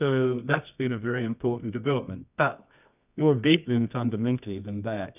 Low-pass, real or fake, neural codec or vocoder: 3.6 kHz; fake; codec, 24 kHz, 0.9 kbps, WavTokenizer, medium music audio release